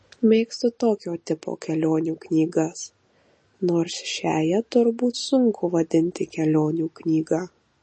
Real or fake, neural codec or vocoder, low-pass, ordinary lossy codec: real; none; 9.9 kHz; MP3, 32 kbps